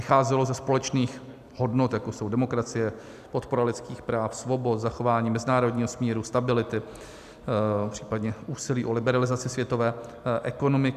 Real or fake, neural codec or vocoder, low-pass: real; none; 14.4 kHz